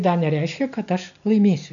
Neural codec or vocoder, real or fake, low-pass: none; real; 7.2 kHz